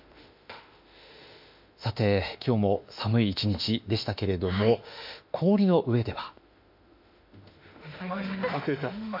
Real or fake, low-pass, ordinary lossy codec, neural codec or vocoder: fake; 5.4 kHz; MP3, 48 kbps; autoencoder, 48 kHz, 32 numbers a frame, DAC-VAE, trained on Japanese speech